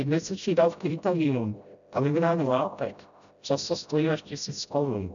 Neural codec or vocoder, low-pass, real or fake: codec, 16 kHz, 0.5 kbps, FreqCodec, smaller model; 7.2 kHz; fake